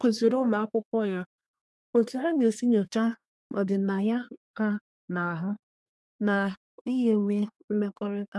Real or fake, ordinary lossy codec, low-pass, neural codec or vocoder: fake; none; none; codec, 24 kHz, 1 kbps, SNAC